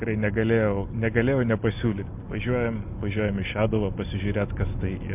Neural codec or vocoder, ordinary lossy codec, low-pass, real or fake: none; MP3, 32 kbps; 3.6 kHz; real